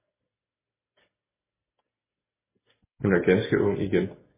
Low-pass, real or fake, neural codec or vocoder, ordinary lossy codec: 3.6 kHz; real; none; MP3, 16 kbps